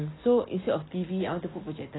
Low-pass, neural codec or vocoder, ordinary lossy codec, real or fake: 7.2 kHz; none; AAC, 16 kbps; real